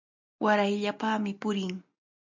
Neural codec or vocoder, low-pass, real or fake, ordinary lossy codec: none; 7.2 kHz; real; MP3, 64 kbps